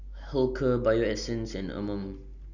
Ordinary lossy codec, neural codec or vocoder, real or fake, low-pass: none; none; real; 7.2 kHz